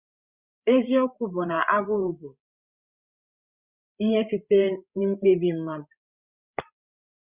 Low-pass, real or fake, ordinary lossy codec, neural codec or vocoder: 3.6 kHz; fake; Opus, 64 kbps; codec, 16 kHz, 16 kbps, FreqCodec, larger model